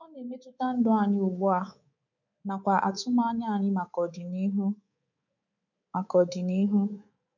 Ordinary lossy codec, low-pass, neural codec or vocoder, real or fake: none; 7.2 kHz; codec, 24 kHz, 3.1 kbps, DualCodec; fake